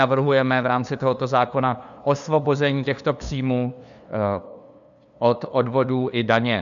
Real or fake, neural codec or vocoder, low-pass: fake; codec, 16 kHz, 2 kbps, FunCodec, trained on LibriTTS, 25 frames a second; 7.2 kHz